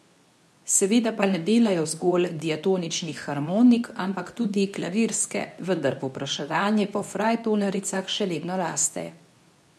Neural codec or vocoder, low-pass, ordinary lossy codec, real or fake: codec, 24 kHz, 0.9 kbps, WavTokenizer, medium speech release version 2; none; none; fake